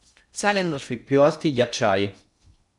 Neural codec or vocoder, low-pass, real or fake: codec, 16 kHz in and 24 kHz out, 0.6 kbps, FocalCodec, streaming, 2048 codes; 10.8 kHz; fake